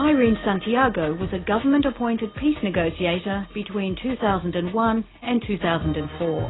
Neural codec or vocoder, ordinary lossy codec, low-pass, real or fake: none; AAC, 16 kbps; 7.2 kHz; real